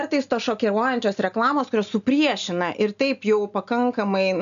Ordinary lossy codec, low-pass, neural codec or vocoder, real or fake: MP3, 96 kbps; 7.2 kHz; none; real